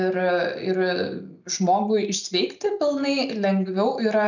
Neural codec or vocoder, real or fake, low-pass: none; real; 7.2 kHz